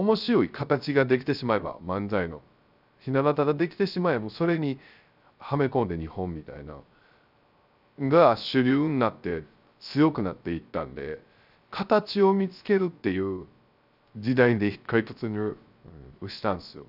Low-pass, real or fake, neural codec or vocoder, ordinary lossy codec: 5.4 kHz; fake; codec, 16 kHz, 0.3 kbps, FocalCodec; none